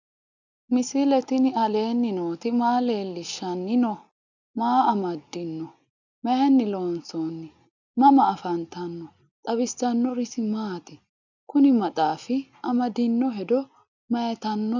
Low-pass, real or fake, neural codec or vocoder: 7.2 kHz; real; none